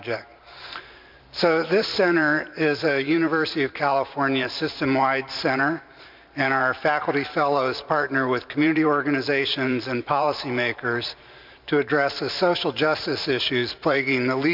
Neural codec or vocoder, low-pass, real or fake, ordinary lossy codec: vocoder, 44.1 kHz, 128 mel bands every 512 samples, BigVGAN v2; 5.4 kHz; fake; MP3, 48 kbps